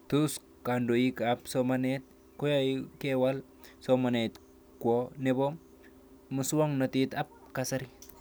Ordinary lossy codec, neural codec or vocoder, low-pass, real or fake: none; none; none; real